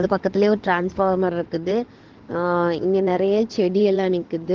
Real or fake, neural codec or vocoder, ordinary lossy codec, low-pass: fake; codec, 16 kHz in and 24 kHz out, 2.2 kbps, FireRedTTS-2 codec; Opus, 16 kbps; 7.2 kHz